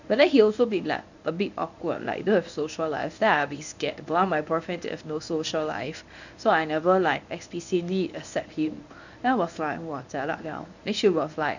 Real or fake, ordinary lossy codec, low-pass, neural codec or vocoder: fake; none; 7.2 kHz; codec, 24 kHz, 0.9 kbps, WavTokenizer, medium speech release version 1